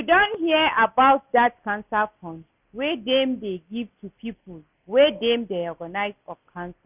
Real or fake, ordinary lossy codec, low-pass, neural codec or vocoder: real; none; 3.6 kHz; none